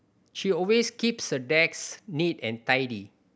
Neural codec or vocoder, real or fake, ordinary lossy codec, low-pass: none; real; none; none